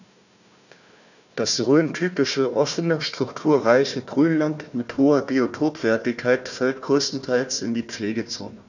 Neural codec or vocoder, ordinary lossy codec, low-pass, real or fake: codec, 16 kHz, 1 kbps, FunCodec, trained on Chinese and English, 50 frames a second; none; 7.2 kHz; fake